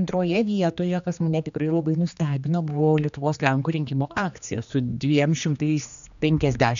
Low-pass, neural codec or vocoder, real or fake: 7.2 kHz; codec, 16 kHz, 2 kbps, X-Codec, HuBERT features, trained on general audio; fake